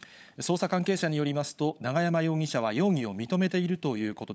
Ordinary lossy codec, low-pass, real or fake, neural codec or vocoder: none; none; fake; codec, 16 kHz, 16 kbps, FunCodec, trained on LibriTTS, 50 frames a second